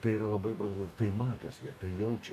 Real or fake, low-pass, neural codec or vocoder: fake; 14.4 kHz; codec, 44.1 kHz, 2.6 kbps, DAC